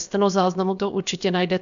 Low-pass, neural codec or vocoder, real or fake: 7.2 kHz; codec, 16 kHz, about 1 kbps, DyCAST, with the encoder's durations; fake